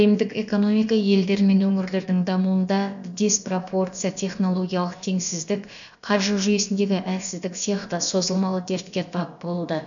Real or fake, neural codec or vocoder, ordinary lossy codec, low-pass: fake; codec, 16 kHz, about 1 kbps, DyCAST, with the encoder's durations; none; 7.2 kHz